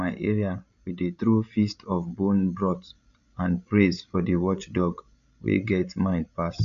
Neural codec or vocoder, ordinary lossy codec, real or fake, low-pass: codec, 16 kHz, 16 kbps, FreqCodec, larger model; none; fake; 7.2 kHz